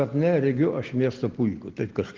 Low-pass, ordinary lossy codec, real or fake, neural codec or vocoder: 7.2 kHz; Opus, 16 kbps; real; none